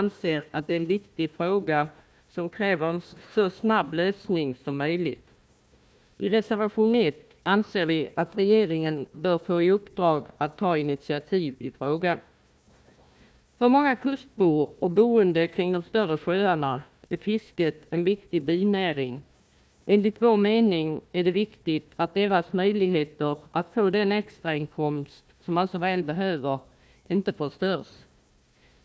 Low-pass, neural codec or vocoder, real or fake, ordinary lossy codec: none; codec, 16 kHz, 1 kbps, FunCodec, trained on Chinese and English, 50 frames a second; fake; none